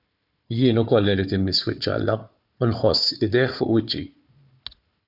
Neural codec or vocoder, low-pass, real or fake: codec, 16 kHz, 4 kbps, FunCodec, trained on Chinese and English, 50 frames a second; 5.4 kHz; fake